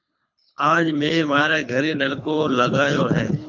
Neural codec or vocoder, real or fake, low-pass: codec, 24 kHz, 3 kbps, HILCodec; fake; 7.2 kHz